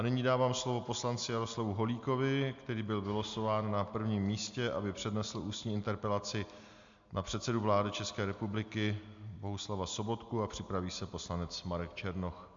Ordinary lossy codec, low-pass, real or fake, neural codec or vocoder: MP3, 64 kbps; 7.2 kHz; real; none